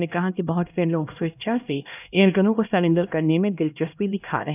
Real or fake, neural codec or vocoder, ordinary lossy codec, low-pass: fake; codec, 16 kHz, 1 kbps, X-Codec, HuBERT features, trained on LibriSpeech; none; 3.6 kHz